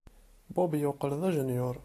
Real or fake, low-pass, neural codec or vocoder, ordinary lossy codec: real; 14.4 kHz; none; AAC, 48 kbps